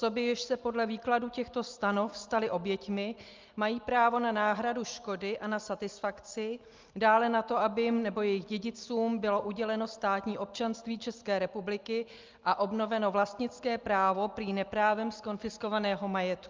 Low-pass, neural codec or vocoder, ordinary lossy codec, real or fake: 7.2 kHz; none; Opus, 32 kbps; real